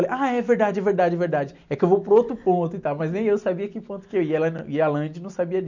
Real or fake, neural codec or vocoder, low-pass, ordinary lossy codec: real; none; 7.2 kHz; none